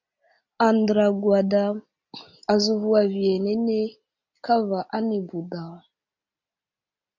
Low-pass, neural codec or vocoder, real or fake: 7.2 kHz; none; real